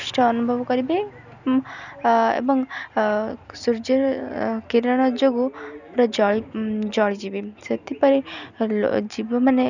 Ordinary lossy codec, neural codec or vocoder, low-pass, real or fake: none; none; 7.2 kHz; real